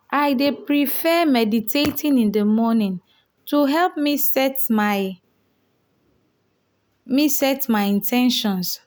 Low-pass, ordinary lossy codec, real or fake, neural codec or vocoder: none; none; real; none